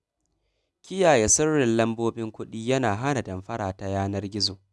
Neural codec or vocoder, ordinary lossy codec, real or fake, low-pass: none; none; real; none